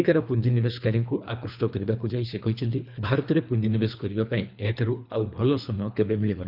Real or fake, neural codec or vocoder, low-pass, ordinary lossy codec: fake; codec, 24 kHz, 3 kbps, HILCodec; 5.4 kHz; none